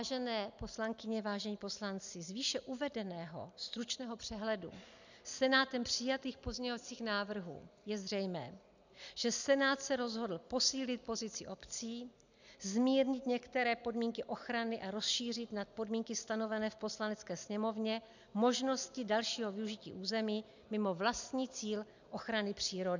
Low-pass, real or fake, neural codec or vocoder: 7.2 kHz; real; none